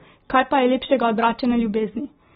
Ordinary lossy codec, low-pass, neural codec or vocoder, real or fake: AAC, 16 kbps; 10.8 kHz; none; real